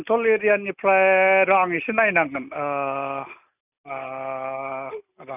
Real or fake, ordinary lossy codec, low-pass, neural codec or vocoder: real; none; 3.6 kHz; none